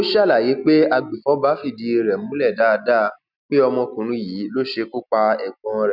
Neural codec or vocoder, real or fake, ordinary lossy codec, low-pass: none; real; none; 5.4 kHz